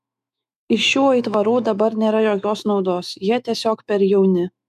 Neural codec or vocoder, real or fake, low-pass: autoencoder, 48 kHz, 128 numbers a frame, DAC-VAE, trained on Japanese speech; fake; 14.4 kHz